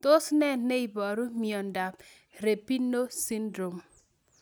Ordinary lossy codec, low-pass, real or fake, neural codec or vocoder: none; none; real; none